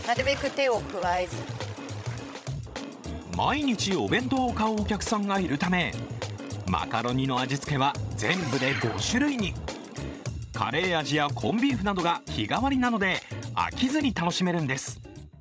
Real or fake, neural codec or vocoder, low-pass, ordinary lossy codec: fake; codec, 16 kHz, 16 kbps, FreqCodec, larger model; none; none